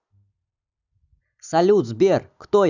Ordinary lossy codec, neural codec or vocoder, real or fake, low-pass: none; none; real; 7.2 kHz